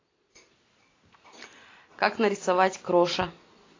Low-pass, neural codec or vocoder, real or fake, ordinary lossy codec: 7.2 kHz; none; real; AAC, 32 kbps